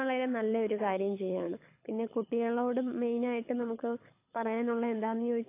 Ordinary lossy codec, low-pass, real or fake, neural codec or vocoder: AAC, 24 kbps; 3.6 kHz; fake; codec, 24 kHz, 6 kbps, HILCodec